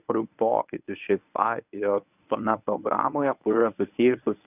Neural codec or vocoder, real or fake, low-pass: codec, 24 kHz, 0.9 kbps, WavTokenizer, medium speech release version 1; fake; 3.6 kHz